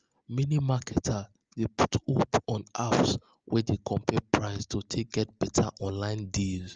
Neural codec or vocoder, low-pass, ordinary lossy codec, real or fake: none; 7.2 kHz; Opus, 24 kbps; real